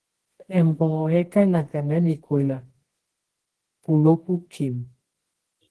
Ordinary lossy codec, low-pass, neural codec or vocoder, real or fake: Opus, 16 kbps; 10.8 kHz; codec, 24 kHz, 0.9 kbps, WavTokenizer, medium music audio release; fake